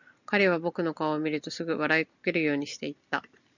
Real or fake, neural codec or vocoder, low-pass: real; none; 7.2 kHz